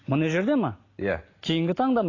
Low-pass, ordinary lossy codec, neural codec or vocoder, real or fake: 7.2 kHz; AAC, 32 kbps; none; real